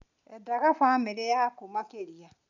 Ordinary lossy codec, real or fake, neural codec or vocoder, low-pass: none; real; none; 7.2 kHz